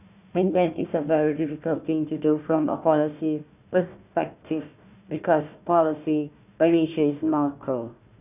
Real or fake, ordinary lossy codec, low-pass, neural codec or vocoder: fake; none; 3.6 kHz; codec, 16 kHz, 1 kbps, FunCodec, trained on Chinese and English, 50 frames a second